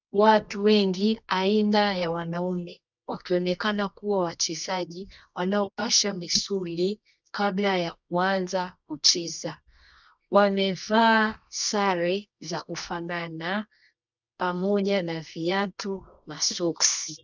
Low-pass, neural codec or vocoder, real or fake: 7.2 kHz; codec, 24 kHz, 0.9 kbps, WavTokenizer, medium music audio release; fake